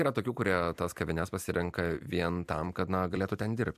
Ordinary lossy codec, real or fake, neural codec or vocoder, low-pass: MP3, 96 kbps; fake; vocoder, 44.1 kHz, 128 mel bands every 256 samples, BigVGAN v2; 14.4 kHz